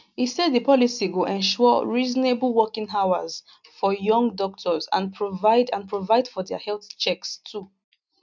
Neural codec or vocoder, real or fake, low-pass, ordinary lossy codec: none; real; 7.2 kHz; MP3, 64 kbps